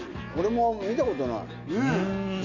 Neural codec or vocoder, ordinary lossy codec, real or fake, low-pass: none; none; real; 7.2 kHz